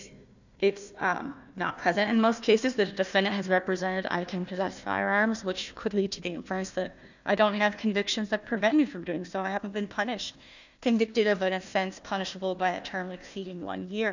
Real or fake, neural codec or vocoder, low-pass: fake; codec, 16 kHz, 1 kbps, FunCodec, trained on Chinese and English, 50 frames a second; 7.2 kHz